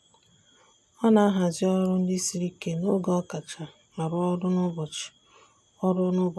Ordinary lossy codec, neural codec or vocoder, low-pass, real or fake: none; none; none; real